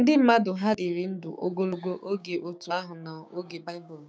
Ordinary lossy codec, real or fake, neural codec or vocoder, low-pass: none; fake; codec, 16 kHz, 6 kbps, DAC; none